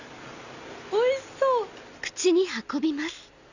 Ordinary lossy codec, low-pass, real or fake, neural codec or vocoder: none; 7.2 kHz; real; none